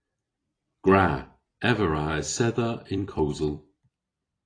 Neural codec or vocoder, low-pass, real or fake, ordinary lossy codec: vocoder, 44.1 kHz, 128 mel bands every 256 samples, BigVGAN v2; 9.9 kHz; fake; AAC, 32 kbps